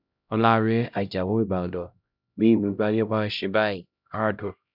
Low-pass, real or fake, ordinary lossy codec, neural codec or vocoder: 5.4 kHz; fake; none; codec, 16 kHz, 0.5 kbps, X-Codec, HuBERT features, trained on LibriSpeech